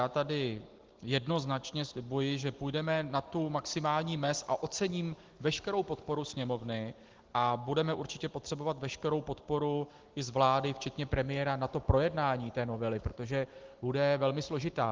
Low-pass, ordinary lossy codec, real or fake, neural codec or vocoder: 7.2 kHz; Opus, 16 kbps; real; none